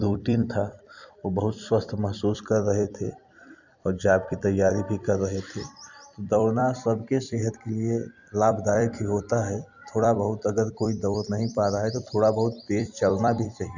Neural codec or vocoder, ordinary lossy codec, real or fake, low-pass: vocoder, 44.1 kHz, 128 mel bands every 256 samples, BigVGAN v2; none; fake; 7.2 kHz